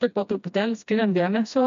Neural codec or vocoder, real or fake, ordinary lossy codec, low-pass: codec, 16 kHz, 1 kbps, FreqCodec, smaller model; fake; MP3, 64 kbps; 7.2 kHz